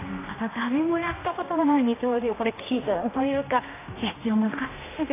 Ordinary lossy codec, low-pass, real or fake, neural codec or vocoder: AAC, 16 kbps; 3.6 kHz; fake; codec, 16 kHz, 1 kbps, X-Codec, HuBERT features, trained on general audio